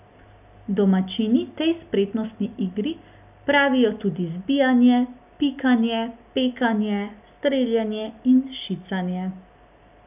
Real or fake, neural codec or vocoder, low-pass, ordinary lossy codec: real; none; 3.6 kHz; none